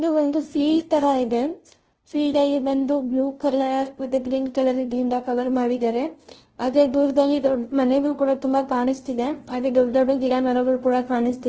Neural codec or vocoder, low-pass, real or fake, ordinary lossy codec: codec, 16 kHz, 0.5 kbps, FunCodec, trained on LibriTTS, 25 frames a second; 7.2 kHz; fake; Opus, 16 kbps